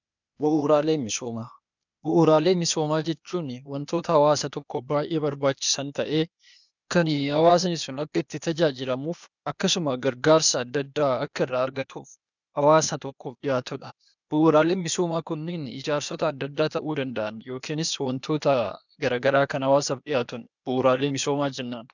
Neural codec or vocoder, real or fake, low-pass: codec, 16 kHz, 0.8 kbps, ZipCodec; fake; 7.2 kHz